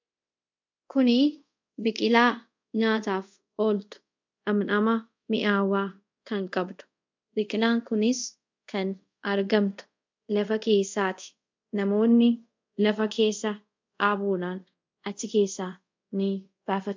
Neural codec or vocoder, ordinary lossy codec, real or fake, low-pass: codec, 24 kHz, 0.5 kbps, DualCodec; MP3, 64 kbps; fake; 7.2 kHz